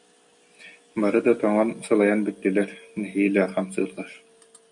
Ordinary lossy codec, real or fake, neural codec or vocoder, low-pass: MP3, 48 kbps; real; none; 10.8 kHz